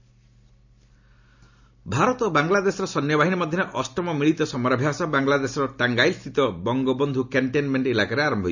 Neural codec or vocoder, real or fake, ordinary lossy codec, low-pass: none; real; none; 7.2 kHz